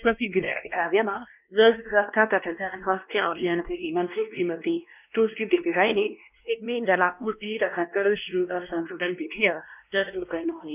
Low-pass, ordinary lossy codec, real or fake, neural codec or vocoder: 3.6 kHz; none; fake; codec, 16 kHz, 1 kbps, X-Codec, WavLM features, trained on Multilingual LibriSpeech